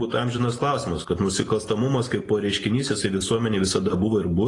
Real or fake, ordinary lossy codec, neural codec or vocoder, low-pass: real; AAC, 32 kbps; none; 10.8 kHz